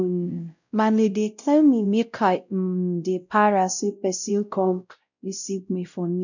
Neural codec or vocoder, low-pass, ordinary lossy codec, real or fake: codec, 16 kHz, 0.5 kbps, X-Codec, WavLM features, trained on Multilingual LibriSpeech; 7.2 kHz; none; fake